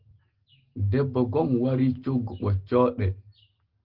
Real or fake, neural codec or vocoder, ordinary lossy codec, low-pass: real; none; Opus, 16 kbps; 5.4 kHz